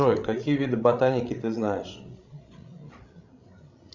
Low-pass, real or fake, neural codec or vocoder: 7.2 kHz; fake; codec, 16 kHz, 16 kbps, FreqCodec, larger model